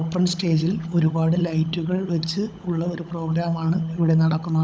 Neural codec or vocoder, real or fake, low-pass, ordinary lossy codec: codec, 16 kHz, 16 kbps, FunCodec, trained on LibriTTS, 50 frames a second; fake; none; none